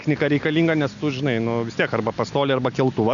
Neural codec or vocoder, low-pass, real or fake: none; 7.2 kHz; real